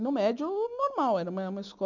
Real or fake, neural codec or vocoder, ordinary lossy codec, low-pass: real; none; none; 7.2 kHz